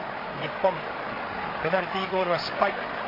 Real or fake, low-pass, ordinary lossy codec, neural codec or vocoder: fake; 5.4 kHz; MP3, 24 kbps; codec, 16 kHz, 8 kbps, FreqCodec, larger model